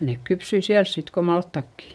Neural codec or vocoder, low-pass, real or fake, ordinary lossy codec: vocoder, 22.05 kHz, 80 mel bands, WaveNeXt; none; fake; none